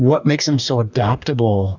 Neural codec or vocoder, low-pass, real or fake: codec, 44.1 kHz, 2.6 kbps, DAC; 7.2 kHz; fake